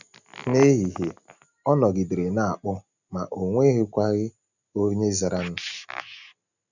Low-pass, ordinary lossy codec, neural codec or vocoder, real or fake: 7.2 kHz; none; none; real